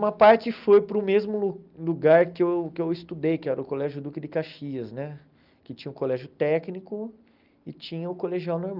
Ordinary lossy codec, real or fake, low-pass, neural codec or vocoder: Opus, 24 kbps; real; 5.4 kHz; none